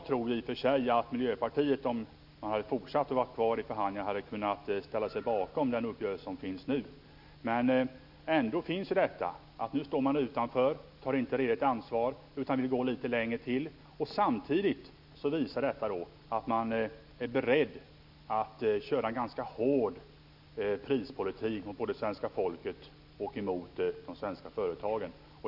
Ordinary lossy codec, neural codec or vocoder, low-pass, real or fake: none; none; 5.4 kHz; real